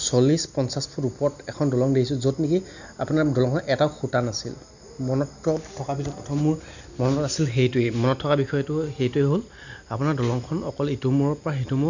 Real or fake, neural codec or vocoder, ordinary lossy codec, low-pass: real; none; none; 7.2 kHz